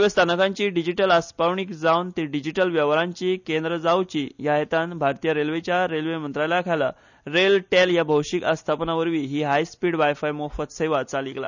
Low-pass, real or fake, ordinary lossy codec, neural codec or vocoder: 7.2 kHz; real; none; none